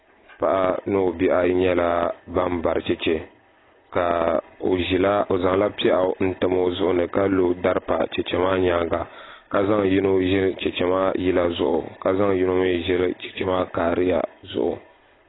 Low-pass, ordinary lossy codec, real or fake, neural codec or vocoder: 7.2 kHz; AAC, 16 kbps; real; none